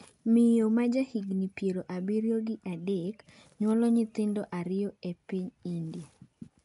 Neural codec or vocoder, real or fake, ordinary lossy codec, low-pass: none; real; none; 10.8 kHz